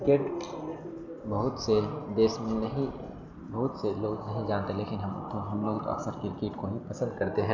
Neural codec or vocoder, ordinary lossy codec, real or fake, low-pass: none; none; real; 7.2 kHz